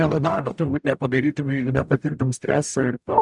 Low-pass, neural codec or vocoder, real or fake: 10.8 kHz; codec, 44.1 kHz, 0.9 kbps, DAC; fake